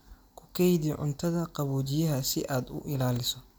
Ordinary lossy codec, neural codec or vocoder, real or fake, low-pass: none; none; real; none